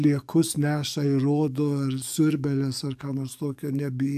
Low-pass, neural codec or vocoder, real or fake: 14.4 kHz; codec, 44.1 kHz, 7.8 kbps, DAC; fake